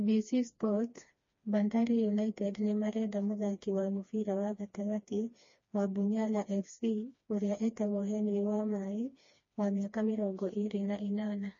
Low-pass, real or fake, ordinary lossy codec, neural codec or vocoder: 7.2 kHz; fake; MP3, 32 kbps; codec, 16 kHz, 2 kbps, FreqCodec, smaller model